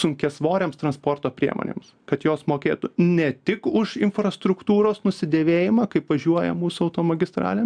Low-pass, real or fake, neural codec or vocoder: 9.9 kHz; real; none